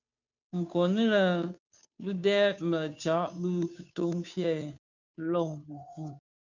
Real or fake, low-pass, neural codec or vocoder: fake; 7.2 kHz; codec, 16 kHz, 2 kbps, FunCodec, trained on Chinese and English, 25 frames a second